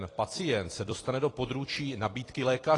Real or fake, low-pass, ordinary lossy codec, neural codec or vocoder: fake; 10.8 kHz; AAC, 32 kbps; vocoder, 44.1 kHz, 128 mel bands every 256 samples, BigVGAN v2